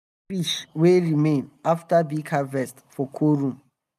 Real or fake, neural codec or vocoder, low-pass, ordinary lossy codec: real; none; 14.4 kHz; none